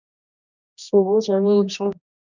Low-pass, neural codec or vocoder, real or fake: 7.2 kHz; codec, 16 kHz, 1 kbps, X-Codec, HuBERT features, trained on general audio; fake